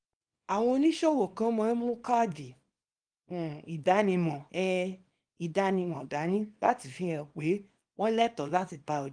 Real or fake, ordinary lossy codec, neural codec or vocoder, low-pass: fake; Opus, 32 kbps; codec, 24 kHz, 0.9 kbps, WavTokenizer, small release; 9.9 kHz